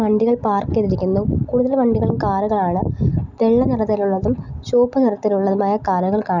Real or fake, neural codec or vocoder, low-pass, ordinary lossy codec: real; none; 7.2 kHz; none